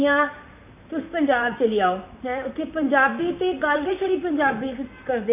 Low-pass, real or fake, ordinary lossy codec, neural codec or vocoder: 3.6 kHz; fake; AAC, 32 kbps; vocoder, 44.1 kHz, 128 mel bands, Pupu-Vocoder